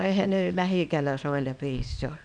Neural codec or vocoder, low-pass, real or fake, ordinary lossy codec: codec, 24 kHz, 0.9 kbps, WavTokenizer, small release; 9.9 kHz; fake; none